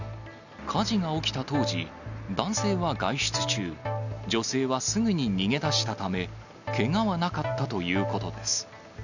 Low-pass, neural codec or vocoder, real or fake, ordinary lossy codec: 7.2 kHz; none; real; none